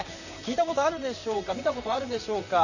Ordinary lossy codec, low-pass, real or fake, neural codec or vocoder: none; 7.2 kHz; fake; vocoder, 44.1 kHz, 128 mel bands, Pupu-Vocoder